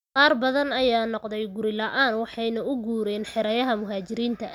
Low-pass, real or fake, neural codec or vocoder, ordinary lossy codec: 19.8 kHz; real; none; none